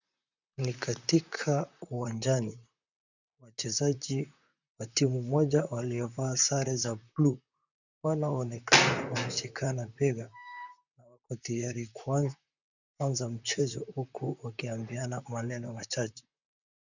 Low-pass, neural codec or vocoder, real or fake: 7.2 kHz; vocoder, 44.1 kHz, 128 mel bands, Pupu-Vocoder; fake